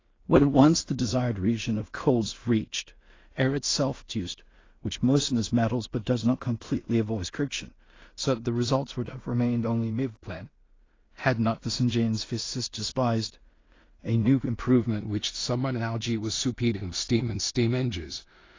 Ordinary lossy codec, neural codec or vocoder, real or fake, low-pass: AAC, 32 kbps; codec, 16 kHz in and 24 kHz out, 0.4 kbps, LongCat-Audio-Codec, two codebook decoder; fake; 7.2 kHz